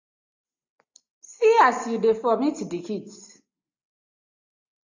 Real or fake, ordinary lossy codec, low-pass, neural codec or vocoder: real; AAC, 48 kbps; 7.2 kHz; none